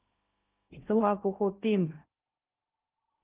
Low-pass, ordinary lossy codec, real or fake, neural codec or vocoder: 3.6 kHz; Opus, 24 kbps; fake; codec, 16 kHz in and 24 kHz out, 0.6 kbps, FocalCodec, streaming, 2048 codes